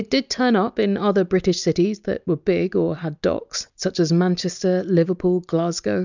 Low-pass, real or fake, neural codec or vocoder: 7.2 kHz; real; none